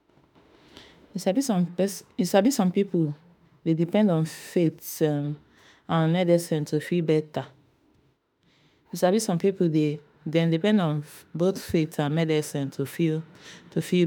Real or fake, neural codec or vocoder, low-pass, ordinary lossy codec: fake; autoencoder, 48 kHz, 32 numbers a frame, DAC-VAE, trained on Japanese speech; none; none